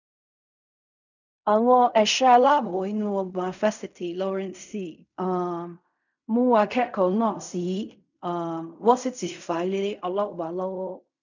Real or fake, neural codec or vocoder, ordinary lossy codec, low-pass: fake; codec, 16 kHz in and 24 kHz out, 0.4 kbps, LongCat-Audio-Codec, fine tuned four codebook decoder; none; 7.2 kHz